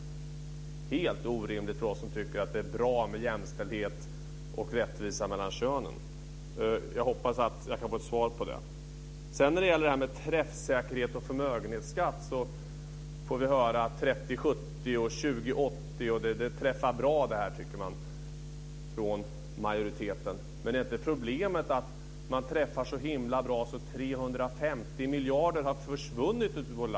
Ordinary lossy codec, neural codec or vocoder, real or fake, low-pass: none; none; real; none